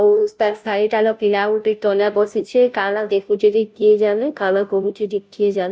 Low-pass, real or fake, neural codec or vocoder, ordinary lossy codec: none; fake; codec, 16 kHz, 0.5 kbps, FunCodec, trained on Chinese and English, 25 frames a second; none